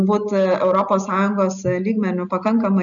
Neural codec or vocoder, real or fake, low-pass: none; real; 7.2 kHz